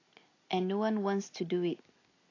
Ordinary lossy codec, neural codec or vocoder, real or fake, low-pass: AAC, 32 kbps; none; real; 7.2 kHz